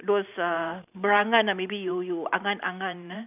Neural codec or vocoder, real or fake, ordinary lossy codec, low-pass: vocoder, 44.1 kHz, 128 mel bands every 512 samples, BigVGAN v2; fake; none; 3.6 kHz